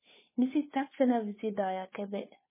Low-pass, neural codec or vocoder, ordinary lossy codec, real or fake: 3.6 kHz; codec, 16 kHz, 2 kbps, FunCodec, trained on Chinese and English, 25 frames a second; MP3, 16 kbps; fake